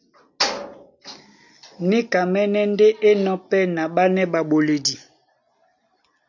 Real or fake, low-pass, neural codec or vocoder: real; 7.2 kHz; none